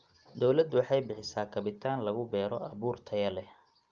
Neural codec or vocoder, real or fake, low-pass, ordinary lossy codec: none; real; 7.2 kHz; Opus, 24 kbps